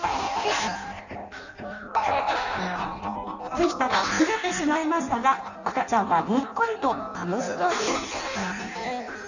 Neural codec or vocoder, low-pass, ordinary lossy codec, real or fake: codec, 16 kHz in and 24 kHz out, 0.6 kbps, FireRedTTS-2 codec; 7.2 kHz; none; fake